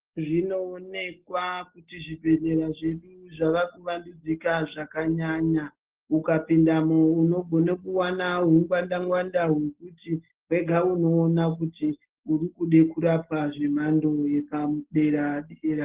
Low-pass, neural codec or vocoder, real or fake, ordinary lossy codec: 3.6 kHz; none; real; Opus, 16 kbps